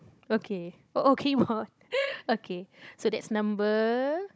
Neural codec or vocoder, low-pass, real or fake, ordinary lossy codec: codec, 16 kHz, 16 kbps, FunCodec, trained on Chinese and English, 50 frames a second; none; fake; none